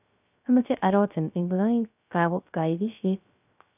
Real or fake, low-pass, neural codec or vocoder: fake; 3.6 kHz; codec, 16 kHz, 0.3 kbps, FocalCodec